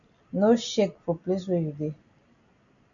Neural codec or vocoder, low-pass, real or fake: none; 7.2 kHz; real